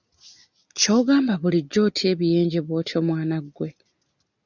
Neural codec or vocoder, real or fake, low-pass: none; real; 7.2 kHz